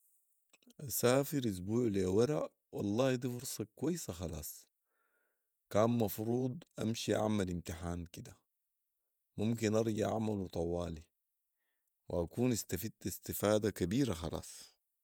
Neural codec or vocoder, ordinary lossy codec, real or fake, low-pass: vocoder, 48 kHz, 128 mel bands, Vocos; none; fake; none